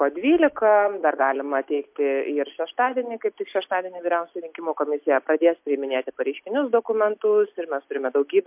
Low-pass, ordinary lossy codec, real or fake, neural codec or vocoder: 3.6 kHz; MP3, 32 kbps; real; none